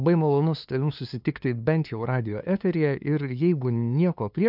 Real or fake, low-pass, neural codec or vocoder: fake; 5.4 kHz; codec, 16 kHz, 2 kbps, FunCodec, trained on LibriTTS, 25 frames a second